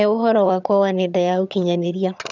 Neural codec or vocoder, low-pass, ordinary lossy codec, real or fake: vocoder, 22.05 kHz, 80 mel bands, HiFi-GAN; 7.2 kHz; none; fake